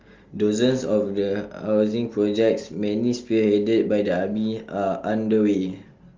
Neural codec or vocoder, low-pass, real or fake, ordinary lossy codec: none; 7.2 kHz; real; Opus, 32 kbps